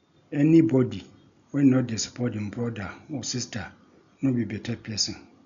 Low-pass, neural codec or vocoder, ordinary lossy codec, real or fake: 7.2 kHz; none; none; real